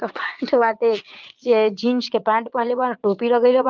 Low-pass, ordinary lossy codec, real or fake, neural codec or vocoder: 7.2 kHz; Opus, 24 kbps; fake; vocoder, 22.05 kHz, 80 mel bands, Vocos